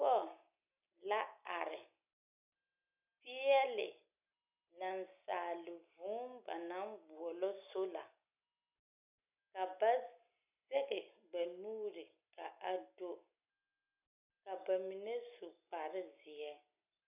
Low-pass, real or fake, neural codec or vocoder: 3.6 kHz; real; none